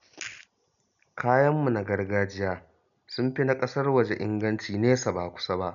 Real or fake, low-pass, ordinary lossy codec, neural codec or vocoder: real; 7.2 kHz; none; none